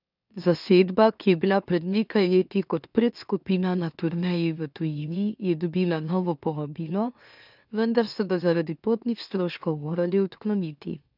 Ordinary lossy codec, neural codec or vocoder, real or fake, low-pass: none; autoencoder, 44.1 kHz, a latent of 192 numbers a frame, MeloTTS; fake; 5.4 kHz